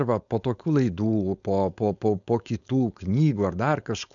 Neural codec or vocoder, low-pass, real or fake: codec, 16 kHz, 4.8 kbps, FACodec; 7.2 kHz; fake